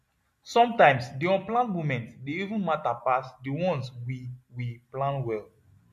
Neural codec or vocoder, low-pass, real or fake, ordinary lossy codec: none; 14.4 kHz; real; MP3, 64 kbps